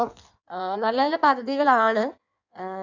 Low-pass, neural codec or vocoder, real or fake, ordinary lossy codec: 7.2 kHz; codec, 16 kHz in and 24 kHz out, 1.1 kbps, FireRedTTS-2 codec; fake; none